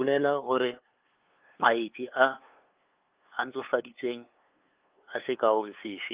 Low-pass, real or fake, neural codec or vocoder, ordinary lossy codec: 3.6 kHz; fake; codec, 16 kHz, 2 kbps, FunCodec, trained on LibriTTS, 25 frames a second; Opus, 32 kbps